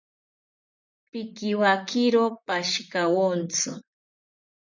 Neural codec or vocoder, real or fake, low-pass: vocoder, 44.1 kHz, 128 mel bands, Pupu-Vocoder; fake; 7.2 kHz